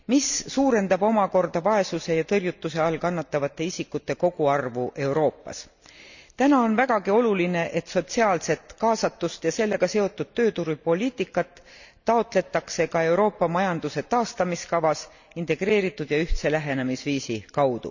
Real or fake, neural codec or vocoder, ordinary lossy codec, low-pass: real; none; none; 7.2 kHz